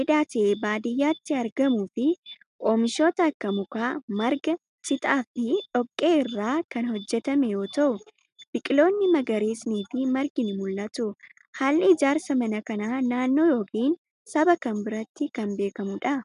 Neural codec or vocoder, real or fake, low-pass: none; real; 10.8 kHz